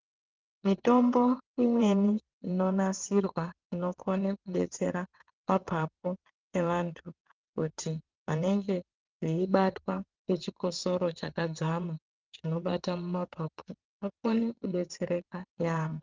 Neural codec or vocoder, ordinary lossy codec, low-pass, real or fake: vocoder, 22.05 kHz, 80 mel bands, WaveNeXt; Opus, 24 kbps; 7.2 kHz; fake